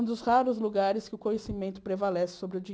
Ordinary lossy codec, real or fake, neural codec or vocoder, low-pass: none; real; none; none